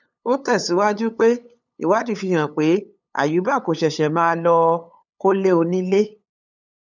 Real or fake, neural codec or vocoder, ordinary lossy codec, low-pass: fake; codec, 16 kHz, 8 kbps, FunCodec, trained on LibriTTS, 25 frames a second; none; 7.2 kHz